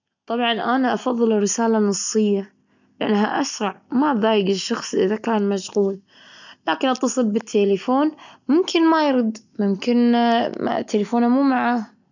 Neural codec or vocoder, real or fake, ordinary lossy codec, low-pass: none; real; none; 7.2 kHz